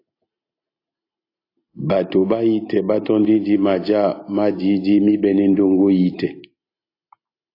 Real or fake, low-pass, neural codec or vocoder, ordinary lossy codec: real; 5.4 kHz; none; AAC, 32 kbps